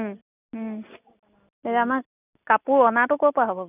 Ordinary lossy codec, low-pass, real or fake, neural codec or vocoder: none; 3.6 kHz; real; none